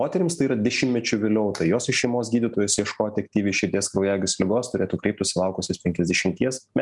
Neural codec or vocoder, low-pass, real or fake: none; 10.8 kHz; real